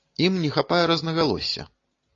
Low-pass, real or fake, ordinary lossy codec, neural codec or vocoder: 7.2 kHz; real; AAC, 32 kbps; none